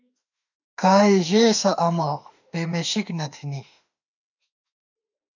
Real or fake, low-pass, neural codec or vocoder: fake; 7.2 kHz; autoencoder, 48 kHz, 32 numbers a frame, DAC-VAE, trained on Japanese speech